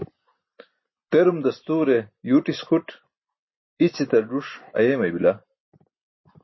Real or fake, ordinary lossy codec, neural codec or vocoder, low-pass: real; MP3, 24 kbps; none; 7.2 kHz